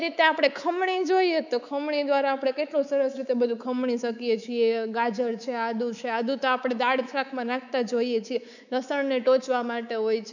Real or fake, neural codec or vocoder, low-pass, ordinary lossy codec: fake; codec, 24 kHz, 3.1 kbps, DualCodec; 7.2 kHz; none